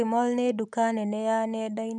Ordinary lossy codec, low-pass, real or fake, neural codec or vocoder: none; 10.8 kHz; real; none